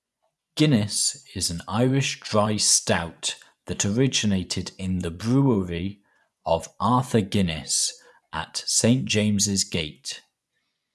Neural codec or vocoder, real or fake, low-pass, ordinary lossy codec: vocoder, 24 kHz, 100 mel bands, Vocos; fake; none; none